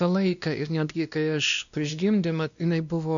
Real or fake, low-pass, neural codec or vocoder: fake; 7.2 kHz; codec, 16 kHz, 1 kbps, X-Codec, WavLM features, trained on Multilingual LibriSpeech